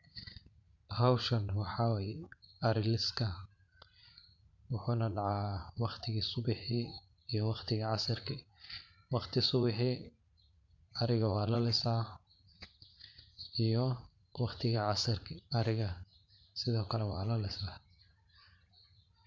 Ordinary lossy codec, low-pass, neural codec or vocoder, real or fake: MP3, 64 kbps; 7.2 kHz; vocoder, 44.1 kHz, 80 mel bands, Vocos; fake